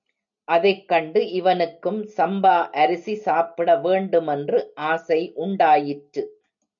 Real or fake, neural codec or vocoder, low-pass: real; none; 7.2 kHz